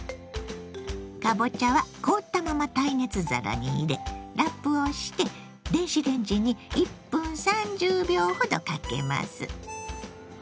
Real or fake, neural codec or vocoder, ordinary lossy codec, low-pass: real; none; none; none